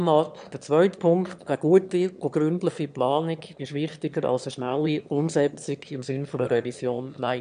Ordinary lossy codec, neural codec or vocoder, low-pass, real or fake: none; autoencoder, 22.05 kHz, a latent of 192 numbers a frame, VITS, trained on one speaker; 9.9 kHz; fake